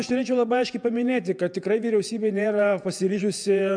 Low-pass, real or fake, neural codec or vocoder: 9.9 kHz; fake; vocoder, 48 kHz, 128 mel bands, Vocos